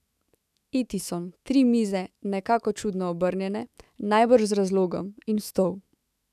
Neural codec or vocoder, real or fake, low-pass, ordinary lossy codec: autoencoder, 48 kHz, 128 numbers a frame, DAC-VAE, trained on Japanese speech; fake; 14.4 kHz; none